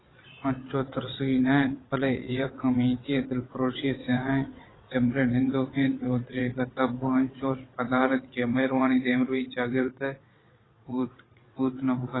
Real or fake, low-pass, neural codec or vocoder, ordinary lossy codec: fake; 7.2 kHz; vocoder, 22.05 kHz, 80 mel bands, WaveNeXt; AAC, 16 kbps